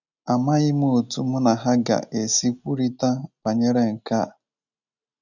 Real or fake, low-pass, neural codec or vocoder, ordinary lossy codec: real; 7.2 kHz; none; none